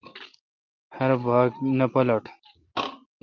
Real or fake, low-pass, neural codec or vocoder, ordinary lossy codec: real; 7.2 kHz; none; Opus, 24 kbps